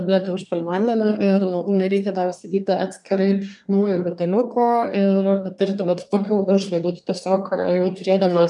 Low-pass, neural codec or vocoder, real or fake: 10.8 kHz; codec, 24 kHz, 1 kbps, SNAC; fake